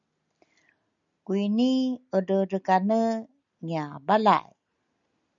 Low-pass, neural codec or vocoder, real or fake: 7.2 kHz; none; real